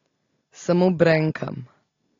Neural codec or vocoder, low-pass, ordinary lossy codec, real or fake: none; 7.2 kHz; AAC, 32 kbps; real